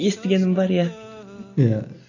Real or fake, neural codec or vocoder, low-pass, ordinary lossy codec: real; none; 7.2 kHz; none